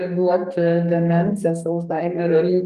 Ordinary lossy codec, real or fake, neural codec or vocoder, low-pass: Opus, 32 kbps; fake; codec, 32 kHz, 1.9 kbps, SNAC; 14.4 kHz